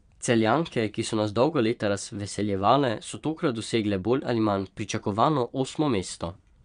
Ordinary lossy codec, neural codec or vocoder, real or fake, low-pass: none; vocoder, 22.05 kHz, 80 mel bands, Vocos; fake; 9.9 kHz